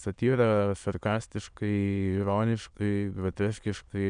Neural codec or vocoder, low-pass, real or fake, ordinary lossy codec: autoencoder, 22.05 kHz, a latent of 192 numbers a frame, VITS, trained on many speakers; 9.9 kHz; fake; MP3, 96 kbps